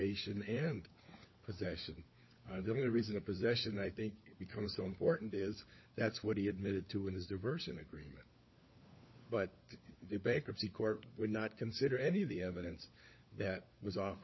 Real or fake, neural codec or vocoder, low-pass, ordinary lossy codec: fake; codec, 16 kHz, 4 kbps, FunCodec, trained on LibriTTS, 50 frames a second; 7.2 kHz; MP3, 24 kbps